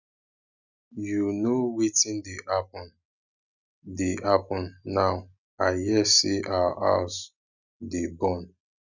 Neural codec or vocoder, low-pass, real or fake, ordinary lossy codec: none; 7.2 kHz; real; none